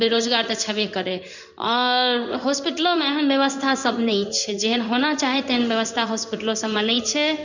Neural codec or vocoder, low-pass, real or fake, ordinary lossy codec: codec, 16 kHz in and 24 kHz out, 1 kbps, XY-Tokenizer; 7.2 kHz; fake; none